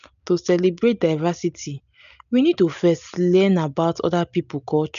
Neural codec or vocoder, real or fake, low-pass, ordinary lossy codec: none; real; 7.2 kHz; none